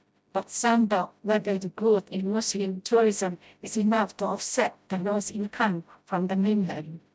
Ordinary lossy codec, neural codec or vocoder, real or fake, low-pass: none; codec, 16 kHz, 0.5 kbps, FreqCodec, smaller model; fake; none